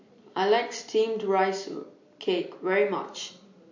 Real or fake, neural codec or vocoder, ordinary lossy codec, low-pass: real; none; MP3, 48 kbps; 7.2 kHz